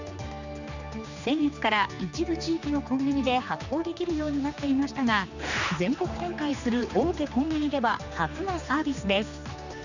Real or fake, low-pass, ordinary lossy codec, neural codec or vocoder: fake; 7.2 kHz; none; codec, 16 kHz, 2 kbps, X-Codec, HuBERT features, trained on general audio